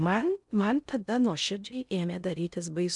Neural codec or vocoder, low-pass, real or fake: codec, 16 kHz in and 24 kHz out, 0.6 kbps, FocalCodec, streaming, 4096 codes; 10.8 kHz; fake